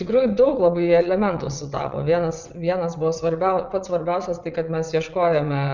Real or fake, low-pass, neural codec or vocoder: fake; 7.2 kHz; vocoder, 22.05 kHz, 80 mel bands, WaveNeXt